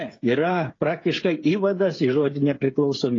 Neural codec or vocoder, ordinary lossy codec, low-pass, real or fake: codec, 16 kHz, 8 kbps, FreqCodec, smaller model; AAC, 32 kbps; 7.2 kHz; fake